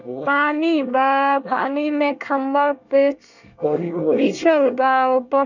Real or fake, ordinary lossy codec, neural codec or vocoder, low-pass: fake; none; codec, 24 kHz, 1 kbps, SNAC; 7.2 kHz